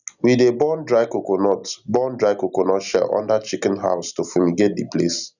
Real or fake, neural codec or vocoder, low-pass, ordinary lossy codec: real; none; 7.2 kHz; none